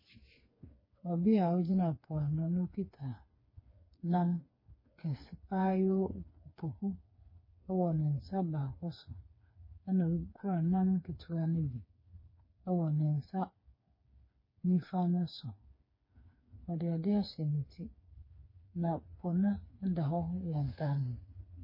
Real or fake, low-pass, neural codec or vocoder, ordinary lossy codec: fake; 5.4 kHz; codec, 16 kHz, 4 kbps, FreqCodec, smaller model; MP3, 24 kbps